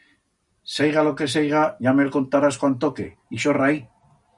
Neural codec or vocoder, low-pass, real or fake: none; 10.8 kHz; real